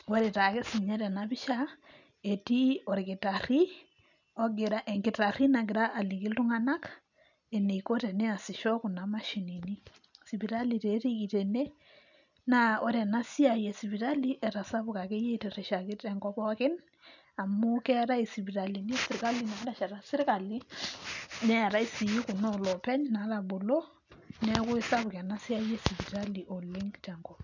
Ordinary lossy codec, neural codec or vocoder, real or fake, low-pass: none; none; real; 7.2 kHz